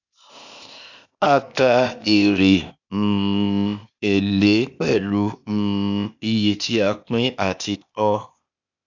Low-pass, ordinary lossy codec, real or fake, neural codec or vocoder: 7.2 kHz; none; fake; codec, 16 kHz, 0.8 kbps, ZipCodec